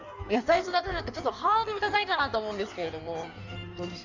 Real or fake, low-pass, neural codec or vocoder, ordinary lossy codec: fake; 7.2 kHz; codec, 16 kHz in and 24 kHz out, 1.1 kbps, FireRedTTS-2 codec; none